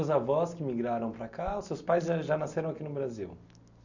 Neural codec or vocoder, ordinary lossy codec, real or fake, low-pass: none; none; real; 7.2 kHz